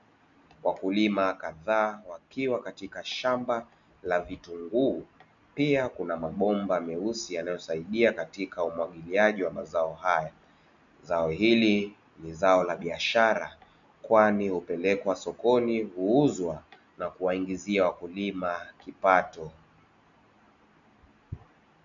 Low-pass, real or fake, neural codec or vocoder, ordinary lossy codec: 7.2 kHz; real; none; MP3, 96 kbps